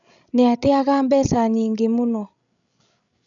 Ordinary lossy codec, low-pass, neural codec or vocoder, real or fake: none; 7.2 kHz; codec, 16 kHz, 16 kbps, FreqCodec, larger model; fake